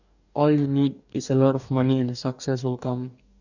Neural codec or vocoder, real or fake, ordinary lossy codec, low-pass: codec, 44.1 kHz, 2.6 kbps, DAC; fake; none; 7.2 kHz